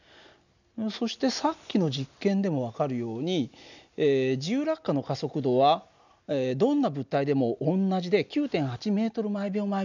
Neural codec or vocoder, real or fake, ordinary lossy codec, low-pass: none; real; none; 7.2 kHz